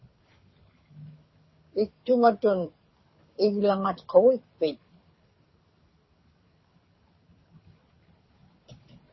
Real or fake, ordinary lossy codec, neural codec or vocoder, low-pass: fake; MP3, 24 kbps; codec, 24 kHz, 6 kbps, HILCodec; 7.2 kHz